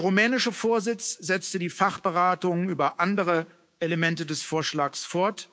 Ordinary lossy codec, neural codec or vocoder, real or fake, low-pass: none; codec, 16 kHz, 6 kbps, DAC; fake; none